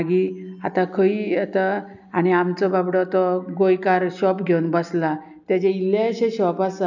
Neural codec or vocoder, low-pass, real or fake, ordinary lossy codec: none; 7.2 kHz; real; none